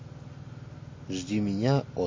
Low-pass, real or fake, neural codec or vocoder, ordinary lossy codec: 7.2 kHz; real; none; MP3, 32 kbps